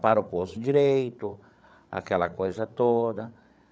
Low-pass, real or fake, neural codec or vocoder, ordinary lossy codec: none; fake; codec, 16 kHz, 8 kbps, FreqCodec, larger model; none